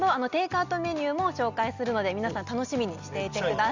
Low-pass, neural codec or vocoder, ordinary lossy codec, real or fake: 7.2 kHz; none; Opus, 64 kbps; real